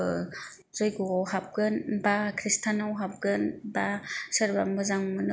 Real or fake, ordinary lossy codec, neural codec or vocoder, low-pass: real; none; none; none